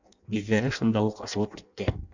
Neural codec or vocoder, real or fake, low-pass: codec, 16 kHz in and 24 kHz out, 0.6 kbps, FireRedTTS-2 codec; fake; 7.2 kHz